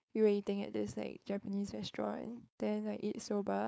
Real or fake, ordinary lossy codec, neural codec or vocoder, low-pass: fake; none; codec, 16 kHz, 4.8 kbps, FACodec; none